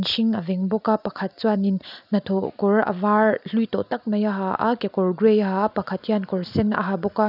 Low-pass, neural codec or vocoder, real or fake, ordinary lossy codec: 5.4 kHz; none; real; none